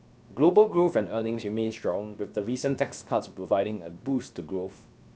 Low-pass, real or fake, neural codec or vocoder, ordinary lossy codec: none; fake; codec, 16 kHz, 0.7 kbps, FocalCodec; none